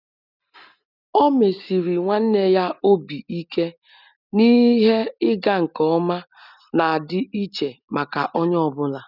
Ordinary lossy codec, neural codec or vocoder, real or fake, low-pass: none; none; real; 5.4 kHz